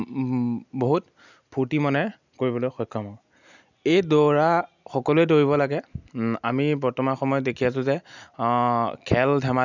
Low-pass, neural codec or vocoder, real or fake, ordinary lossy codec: 7.2 kHz; none; real; none